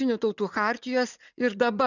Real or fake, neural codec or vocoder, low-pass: fake; vocoder, 44.1 kHz, 80 mel bands, Vocos; 7.2 kHz